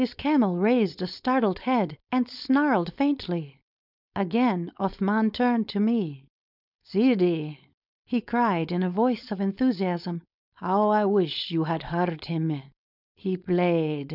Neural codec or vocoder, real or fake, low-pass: codec, 16 kHz, 4.8 kbps, FACodec; fake; 5.4 kHz